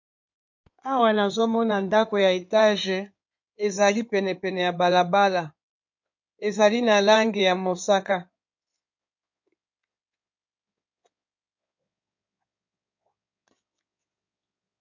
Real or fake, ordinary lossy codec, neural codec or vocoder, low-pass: fake; MP3, 48 kbps; codec, 16 kHz in and 24 kHz out, 2.2 kbps, FireRedTTS-2 codec; 7.2 kHz